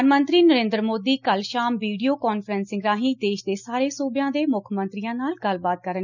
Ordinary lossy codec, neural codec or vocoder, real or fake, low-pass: none; none; real; 7.2 kHz